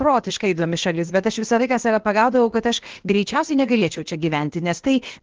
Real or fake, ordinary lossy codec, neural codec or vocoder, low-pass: fake; Opus, 16 kbps; codec, 16 kHz, 0.8 kbps, ZipCodec; 7.2 kHz